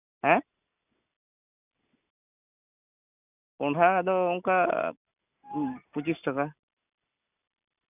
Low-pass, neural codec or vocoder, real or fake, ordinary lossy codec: 3.6 kHz; none; real; none